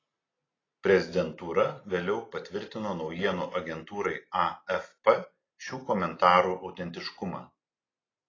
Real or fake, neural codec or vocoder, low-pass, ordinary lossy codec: real; none; 7.2 kHz; AAC, 32 kbps